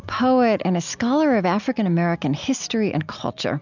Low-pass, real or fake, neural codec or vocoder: 7.2 kHz; real; none